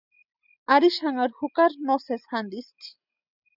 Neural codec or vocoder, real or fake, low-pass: none; real; 5.4 kHz